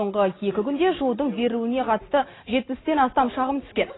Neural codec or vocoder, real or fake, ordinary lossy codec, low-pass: none; real; AAC, 16 kbps; 7.2 kHz